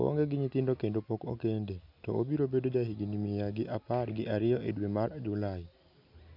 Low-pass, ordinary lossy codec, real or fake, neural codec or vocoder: 5.4 kHz; none; real; none